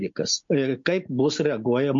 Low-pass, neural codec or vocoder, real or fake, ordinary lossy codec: 7.2 kHz; none; real; MP3, 48 kbps